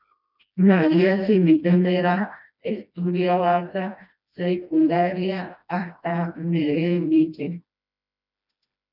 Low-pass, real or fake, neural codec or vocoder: 5.4 kHz; fake; codec, 16 kHz, 1 kbps, FreqCodec, smaller model